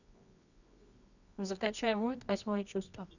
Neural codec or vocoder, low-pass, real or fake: codec, 24 kHz, 0.9 kbps, WavTokenizer, medium music audio release; 7.2 kHz; fake